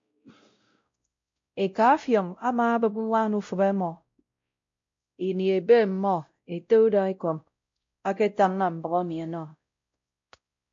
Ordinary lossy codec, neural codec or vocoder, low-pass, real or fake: MP3, 48 kbps; codec, 16 kHz, 0.5 kbps, X-Codec, WavLM features, trained on Multilingual LibriSpeech; 7.2 kHz; fake